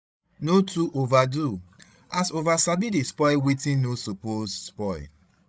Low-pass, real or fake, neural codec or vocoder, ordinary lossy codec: none; fake; codec, 16 kHz, 16 kbps, FreqCodec, larger model; none